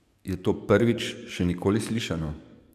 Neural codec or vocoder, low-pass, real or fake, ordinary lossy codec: codec, 44.1 kHz, 7.8 kbps, DAC; 14.4 kHz; fake; none